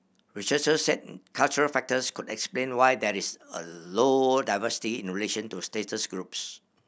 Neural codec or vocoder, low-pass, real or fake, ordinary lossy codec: none; none; real; none